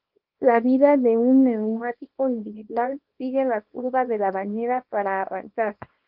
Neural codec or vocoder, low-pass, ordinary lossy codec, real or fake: codec, 24 kHz, 0.9 kbps, WavTokenizer, small release; 5.4 kHz; Opus, 32 kbps; fake